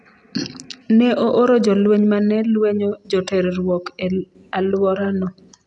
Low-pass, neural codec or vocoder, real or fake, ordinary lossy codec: 10.8 kHz; none; real; none